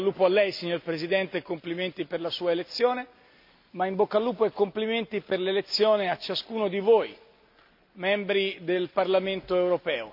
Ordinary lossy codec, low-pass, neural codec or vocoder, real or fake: MP3, 48 kbps; 5.4 kHz; none; real